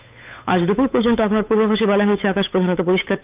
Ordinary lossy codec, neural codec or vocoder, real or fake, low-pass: Opus, 24 kbps; codec, 16 kHz, 8 kbps, FunCodec, trained on Chinese and English, 25 frames a second; fake; 3.6 kHz